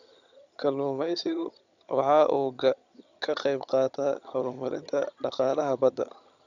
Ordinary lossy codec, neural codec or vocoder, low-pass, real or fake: none; vocoder, 22.05 kHz, 80 mel bands, HiFi-GAN; 7.2 kHz; fake